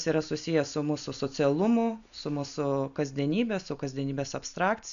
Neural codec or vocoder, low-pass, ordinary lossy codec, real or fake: none; 7.2 kHz; MP3, 96 kbps; real